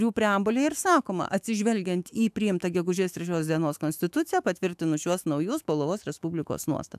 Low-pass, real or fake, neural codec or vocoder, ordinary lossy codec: 14.4 kHz; fake; autoencoder, 48 kHz, 128 numbers a frame, DAC-VAE, trained on Japanese speech; AAC, 96 kbps